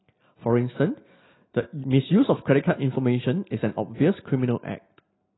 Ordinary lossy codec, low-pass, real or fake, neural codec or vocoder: AAC, 16 kbps; 7.2 kHz; real; none